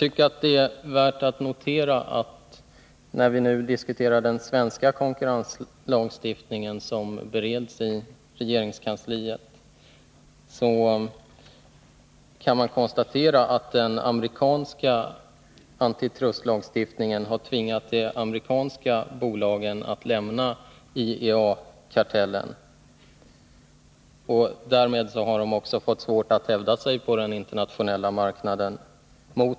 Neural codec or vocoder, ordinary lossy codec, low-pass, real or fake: none; none; none; real